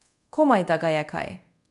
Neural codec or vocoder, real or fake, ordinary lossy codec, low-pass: codec, 24 kHz, 0.5 kbps, DualCodec; fake; none; 10.8 kHz